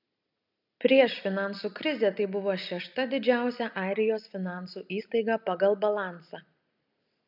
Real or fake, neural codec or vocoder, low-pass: real; none; 5.4 kHz